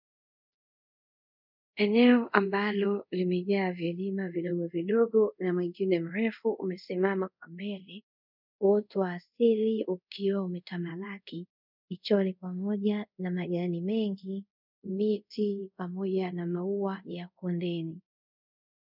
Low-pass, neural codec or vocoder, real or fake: 5.4 kHz; codec, 24 kHz, 0.5 kbps, DualCodec; fake